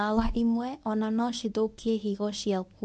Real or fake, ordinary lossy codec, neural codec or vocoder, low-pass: fake; none; codec, 24 kHz, 0.9 kbps, WavTokenizer, medium speech release version 1; none